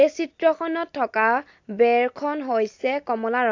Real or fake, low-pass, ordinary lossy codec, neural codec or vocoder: real; 7.2 kHz; AAC, 48 kbps; none